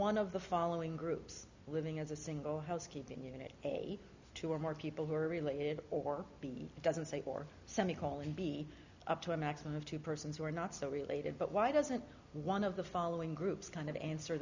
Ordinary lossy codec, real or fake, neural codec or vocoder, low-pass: Opus, 64 kbps; real; none; 7.2 kHz